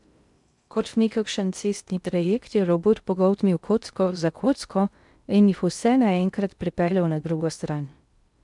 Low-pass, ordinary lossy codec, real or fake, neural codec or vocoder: 10.8 kHz; none; fake; codec, 16 kHz in and 24 kHz out, 0.6 kbps, FocalCodec, streaming, 2048 codes